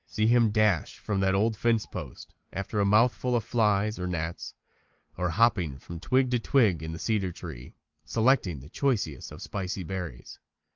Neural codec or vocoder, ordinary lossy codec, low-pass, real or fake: none; Opus, 24 kbps; 7.2 kHz; real